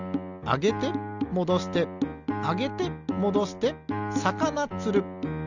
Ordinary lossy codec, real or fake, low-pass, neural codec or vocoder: none; real; 7.2 kHz; none